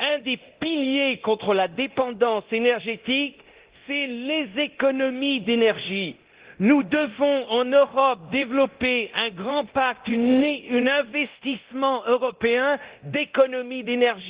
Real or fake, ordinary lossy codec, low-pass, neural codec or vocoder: fake; Opus, 16 kbps; 3.6 kHz; codec, 24 kHz, 0.9 kbps, DualCodec